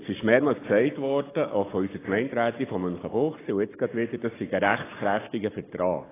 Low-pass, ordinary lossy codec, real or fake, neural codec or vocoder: 3.6 kHz; AAC, 16 kbps; real; none